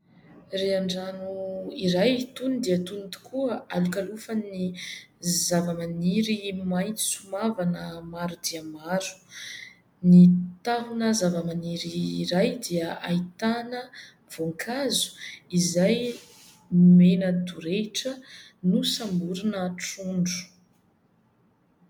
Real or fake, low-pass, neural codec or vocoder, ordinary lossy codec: real; 19.8 kHz; none; MP3, 96 kbps